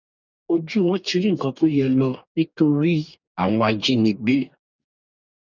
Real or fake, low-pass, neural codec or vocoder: fake; 7.2 kHz; codec, 44.1 kHz, 2.6 kbps, DAC